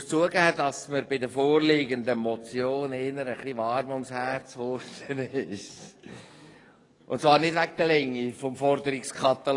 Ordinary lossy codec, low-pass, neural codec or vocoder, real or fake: AAC, 32 kbps; 10.8 kHz; codec, 44.1 kHz, 7.8 kbps, DAC; fake